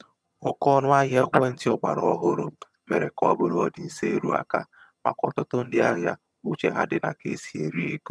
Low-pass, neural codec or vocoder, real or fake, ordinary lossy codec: none; vocoder, 22.05 kHz, 80 mel bands, HiFi-GAN; fake; none